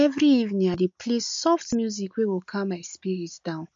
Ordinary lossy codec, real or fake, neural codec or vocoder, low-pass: MP3, 48 kbps; real; none; 7.2 kHz